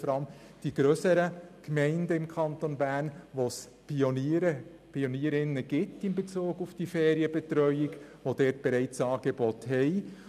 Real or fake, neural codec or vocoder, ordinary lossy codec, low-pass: real; none; none; 14.4 kHz